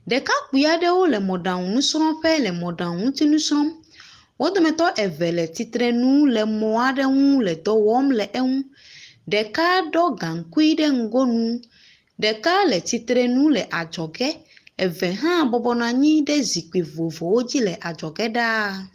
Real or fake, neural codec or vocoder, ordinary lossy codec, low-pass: real; none; Opus, 24 kbps; 14.4 kHz